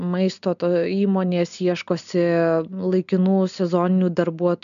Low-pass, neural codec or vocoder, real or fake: 7.2 kHz; none; real